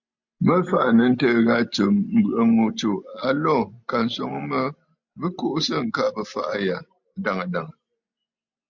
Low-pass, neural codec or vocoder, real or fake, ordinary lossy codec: 7.2 kHz; none; real; MP3, 64 kbps